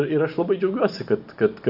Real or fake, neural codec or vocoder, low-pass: real; none; 5.4 kHz